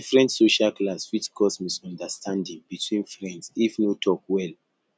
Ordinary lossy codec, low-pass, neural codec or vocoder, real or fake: none; none; none; real